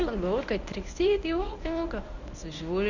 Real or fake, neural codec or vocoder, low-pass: fake; codec, 24 kHz, 0.9 kbps, WavTokenizer, medium speech release version 1; 7.2 kHz